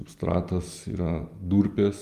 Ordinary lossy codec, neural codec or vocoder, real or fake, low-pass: Opus, 32 kbps; none; real; 14.4 kHz